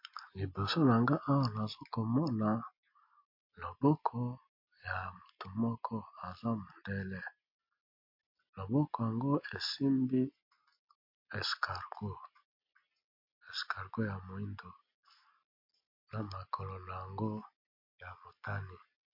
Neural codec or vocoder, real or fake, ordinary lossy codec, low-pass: none; real; MP3, 32 kbps; 5.4 kHz